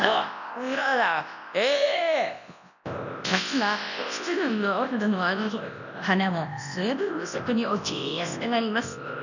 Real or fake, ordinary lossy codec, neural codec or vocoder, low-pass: fake; none; codec, 24 kHz, 0.9 kbps, WavTokenizer, large speech release; 7.2 kHz